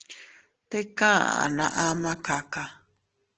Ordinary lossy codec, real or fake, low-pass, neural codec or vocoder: Opus, 16 kbps; real; 7.2 kHz; none